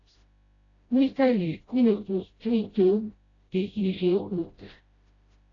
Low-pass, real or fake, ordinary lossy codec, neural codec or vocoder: 7.2 kHz; fake; AAC, 32 kbps; codec, 16 kHz, 0.5 kbps, FreqCodec, smaller model